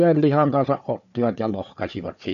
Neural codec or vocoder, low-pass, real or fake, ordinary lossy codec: codec, 16 kHz, 4 kbps, FunCodec, trained on Chinese and English, 50 frames a second; 7.2 kHz; fake; none